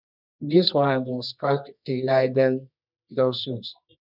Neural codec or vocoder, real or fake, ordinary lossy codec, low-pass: codec, 24 kHz, 0.9 kbps, WavTokenizer, medium music audio release; fake; none; 5.4 kHz